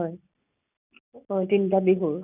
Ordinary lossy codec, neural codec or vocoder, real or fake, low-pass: none; none; real; 3.6 kHz